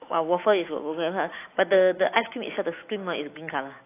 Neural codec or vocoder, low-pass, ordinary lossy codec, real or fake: autoencoder, 48 kHz, 128 numbers a frame, DAC-VAE, trained on Japanese speech; 3.6 kHz; AAC, 24 kbps; fake